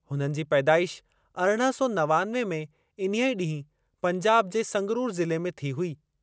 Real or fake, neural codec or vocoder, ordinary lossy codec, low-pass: real; none; none; none